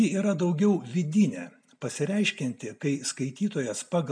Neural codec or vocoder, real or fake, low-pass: none; real; 9.9 kHz